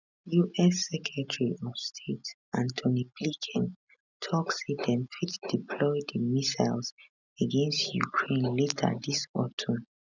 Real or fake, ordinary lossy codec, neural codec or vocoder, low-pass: real; none; none; 7.2 kHz